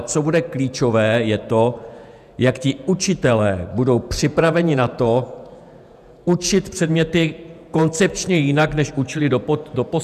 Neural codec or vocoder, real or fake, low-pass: vocoder, 48 kHz, 128 mel bands, Vocos; fake; 14.4 kHz